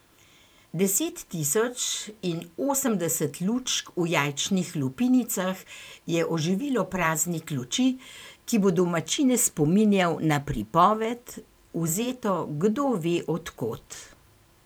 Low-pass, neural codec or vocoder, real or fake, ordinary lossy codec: none; vocoder, 44.1 kHz, 128 mel bands every 512 samples, BigVGAN v2; fake; none